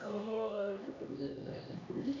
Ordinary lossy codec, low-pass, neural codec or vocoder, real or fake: none; 7.2 kHz; codec, 16 kHz, 1 kbps, X-Codec, HuBERT features, trained on LibriSpeech; fake